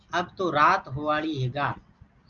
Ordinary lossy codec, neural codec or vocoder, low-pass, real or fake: Opus, 24 kbps; none; 7.2 kHz; real